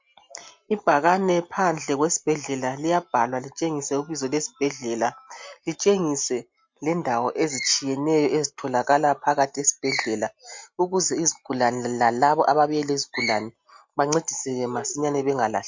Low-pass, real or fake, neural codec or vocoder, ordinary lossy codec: 7.2 kHz; real; none; MP3, 48 kbps